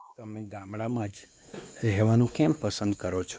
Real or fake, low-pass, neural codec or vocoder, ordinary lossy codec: fake; none; codec, 16 kHz, 2 kbps, X-Codec, WavLM features, trained on Multilingual LibriSpeech; none